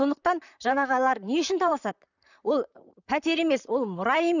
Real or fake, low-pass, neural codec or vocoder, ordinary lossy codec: fake; 7.2 kHz; vocoder, 44.1 kHz, 128 mel bands, Pupu-Vocoder; none